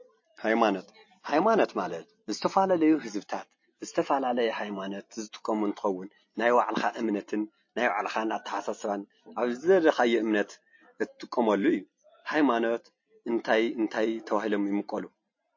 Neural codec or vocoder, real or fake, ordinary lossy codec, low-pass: none; real; MP3, 32 kbps; 7.2 kHz